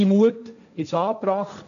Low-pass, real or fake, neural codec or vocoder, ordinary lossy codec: 7.2 kHz; fake; codec, 16 kHz, 1.1 kbps, Voila-Tokenizer; none